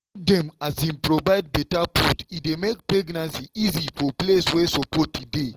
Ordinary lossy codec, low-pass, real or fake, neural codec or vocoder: Opus, 16 kbps; 14.4 kHz; real; none